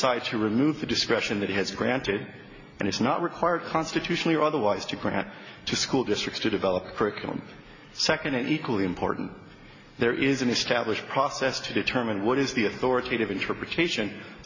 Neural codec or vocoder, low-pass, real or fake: none; 7.2 kHz; real